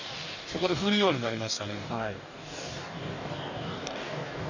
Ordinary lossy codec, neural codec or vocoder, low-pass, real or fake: none; codec, 44.1 kHz, 2.6 kbps, DAC; 7.2 kHz; fake